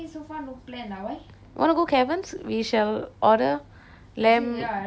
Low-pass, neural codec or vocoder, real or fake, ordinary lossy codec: none; none; real; none